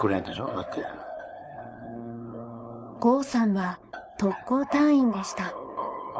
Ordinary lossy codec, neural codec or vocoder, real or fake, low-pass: none; codec, 16 kHz, 4.8 kbps, FACodec; fake; none